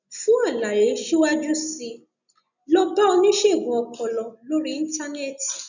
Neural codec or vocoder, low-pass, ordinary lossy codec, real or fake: none; 7.2 kHz; none; real